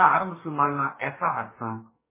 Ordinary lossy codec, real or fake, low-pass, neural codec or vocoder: MP3, 16 kbps; fake; 3.6 kHz; codec, 44.1 kHz, 2.6 kbps, DAC